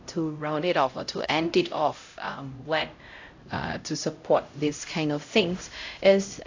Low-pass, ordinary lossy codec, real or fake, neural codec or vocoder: 7.2 kHz; AAC, 48 kbps; fake; codec, 16 kHz, 0.5 kbps, X-Codec, HuBERT features, trained on LibriSpeech